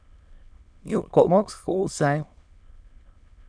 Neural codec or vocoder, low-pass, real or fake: autoencoder, 22.05 kHz, a latent of 192 numbers a frame, VITS, trained on many speakers; 9.9 kHz; fake